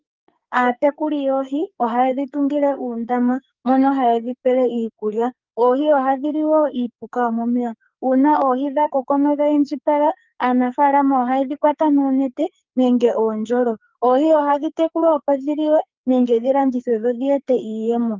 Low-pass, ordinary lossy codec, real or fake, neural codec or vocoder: 7.2 kHz; Opus, 24 kbps; fake; codec, 44.1 kHz, 2.6 kbps, SNAC